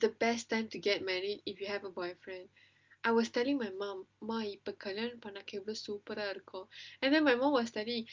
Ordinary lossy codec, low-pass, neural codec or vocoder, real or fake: Opus, 24 kbps; 7.2 kHz; none; real